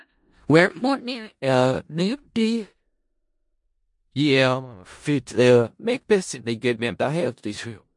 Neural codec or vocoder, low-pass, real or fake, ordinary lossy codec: codec, 16 kHz in and 24 kHz out, 0.4 kbps, LongCat-Audio-Codec, four codebook decoder; 10.8 kHz; fake; MP3, 48 kbps